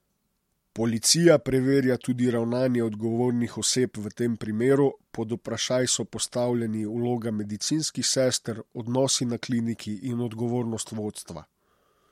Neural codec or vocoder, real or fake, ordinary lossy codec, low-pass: none; real; MP3, 64 kbps; 19.8 kHz